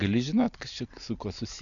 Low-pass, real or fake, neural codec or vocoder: 7.2 kHz; real; none